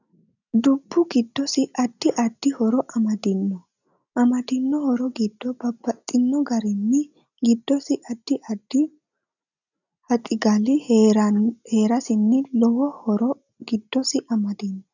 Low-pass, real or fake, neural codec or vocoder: 7.2 kHz; real; none